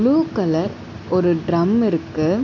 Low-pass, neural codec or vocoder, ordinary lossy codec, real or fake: 7.2 kHz; none; none; real